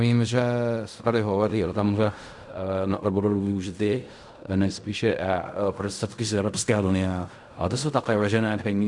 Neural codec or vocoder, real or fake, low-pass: codec, 16 kHz in and 24 kHz out, 0.4 kbps, LongCat-Audio-Codec, fine tuned four codebook decoder; fake; 10.8 kHz